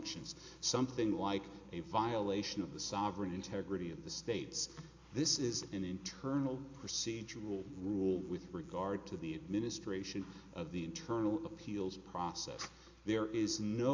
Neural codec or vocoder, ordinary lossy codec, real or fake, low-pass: none; AAC, 48 kbps; real; 7.2 kHz